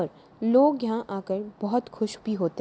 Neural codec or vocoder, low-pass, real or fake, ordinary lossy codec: none; none; real; none